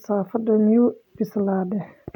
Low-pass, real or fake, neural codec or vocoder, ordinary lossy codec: 19.8 kHz; real; none; none